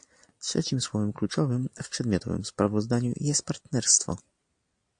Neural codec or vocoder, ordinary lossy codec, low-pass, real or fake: none; AAC, 64 kbps; 9.9 kHz; real